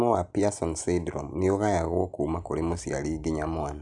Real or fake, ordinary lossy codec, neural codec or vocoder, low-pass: real; none; none; 10.8 kHz